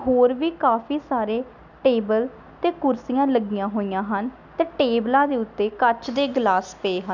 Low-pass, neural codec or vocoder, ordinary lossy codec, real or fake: 7.2 kHz; none; none; real